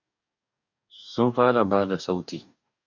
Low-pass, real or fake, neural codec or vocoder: 7.2 kHz; fake; codec, 44.1 kHz, 2.6 kbps, DAC